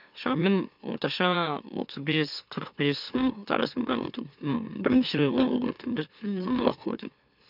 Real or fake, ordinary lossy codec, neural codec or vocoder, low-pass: fake; none; autoencoder, 44.1 kHz, a latent of 192 numbers a frame, MeloTTS; 5.4 kHz